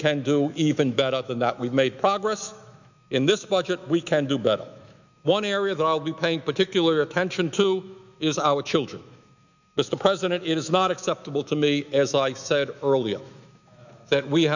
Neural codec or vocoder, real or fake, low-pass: autoencoder, 48 kHz, 128 numbers a frame, DAC-VAE, trained on Japanese speech; fake; 7.2 kHz